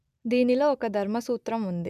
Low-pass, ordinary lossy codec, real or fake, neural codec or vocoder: 14.4 kHz; none; real; none